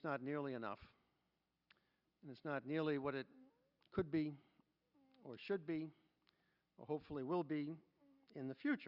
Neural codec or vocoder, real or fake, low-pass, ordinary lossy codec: none; real; 5.4 kHz; Opus, 64 kbps